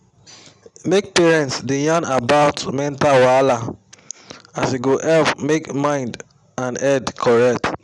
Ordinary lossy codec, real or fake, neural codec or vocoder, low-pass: none; real; none; 10.8 kHz